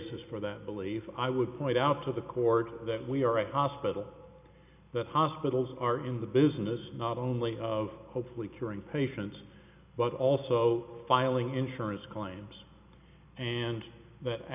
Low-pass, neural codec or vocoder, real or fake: 3.6 kHz; none; real